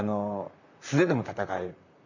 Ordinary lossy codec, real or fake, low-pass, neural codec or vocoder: none; fake; 7.2 kHz; vocoder, 44.1 kHz, 128 mel bands, Pupu-Vocoder